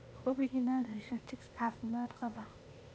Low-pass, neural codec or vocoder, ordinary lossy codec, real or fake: none; codec, 16 kHz, 0.8 kbps, ZipCodec; none; fake